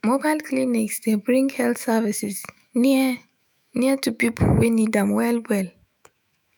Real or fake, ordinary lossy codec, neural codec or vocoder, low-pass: fake; none; autoencoder, 48 kHz, 128 numbers a frame, DAC-VAE, trained on Japanese speech; none